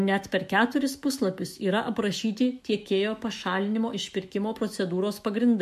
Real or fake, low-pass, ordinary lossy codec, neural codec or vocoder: fake; 14.4 kHz; MP3, 64 kbps; autoencoder, 48 kHz, 128 numbers a frame, DAC-VAE, trained on Japanese speech